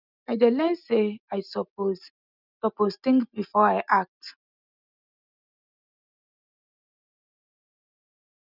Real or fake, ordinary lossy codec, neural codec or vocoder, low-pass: real; none; none; 5.4 kHz